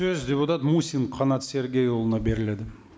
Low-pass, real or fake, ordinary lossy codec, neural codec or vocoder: none; real; none; none